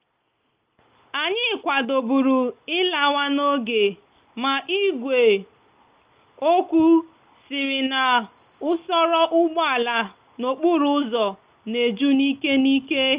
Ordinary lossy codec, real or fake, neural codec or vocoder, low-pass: Opus, 24 kbps; real; none; 3.6 kHz